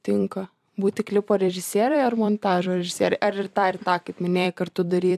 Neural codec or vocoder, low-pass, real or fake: vocoder, 44.1 kHz, 128 mel bands every 256 samples, BigVGAN v2; 14.4 kHz; fake